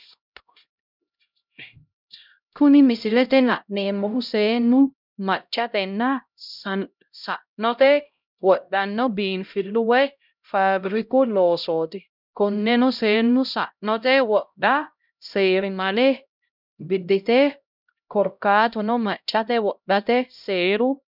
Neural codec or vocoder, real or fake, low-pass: codec, 16 kHz, 0.5 kbps, X-Codec, HuBERT features, trained on LibriSpeech; fake; 5.4 kHz